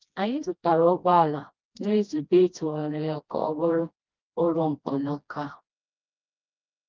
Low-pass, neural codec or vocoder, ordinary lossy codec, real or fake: 7.2 kHz; codec, 16 kHz, 1 kbps, FreqCodec, smaller model; Opus, 24 kbps; fake